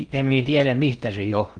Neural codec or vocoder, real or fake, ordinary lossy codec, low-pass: codec, 16 kHz in and 24 kHz out, 0.6 kbps, FocalCodec, streaming, 4096 codes; fake; Opus, 24 kbps; 9.9 kHz